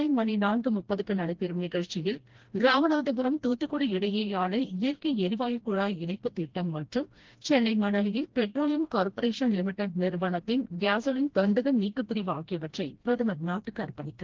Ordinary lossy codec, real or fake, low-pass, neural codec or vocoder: Opus, 16 kbps; fake; 7.2 kHz; codec, 16 kHz, 1 kbps, FreqCodec, smaller model